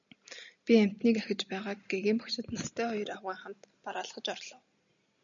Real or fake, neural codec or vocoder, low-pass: real; none; 7.2 kHz